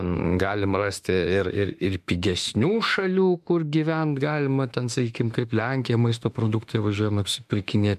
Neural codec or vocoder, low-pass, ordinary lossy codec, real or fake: autoencoder, 48 kHz, 32 numbers a frame, DAC-VAE, trained on Japanese speech; 14.4 kHz; MP3, 96 kbps; fake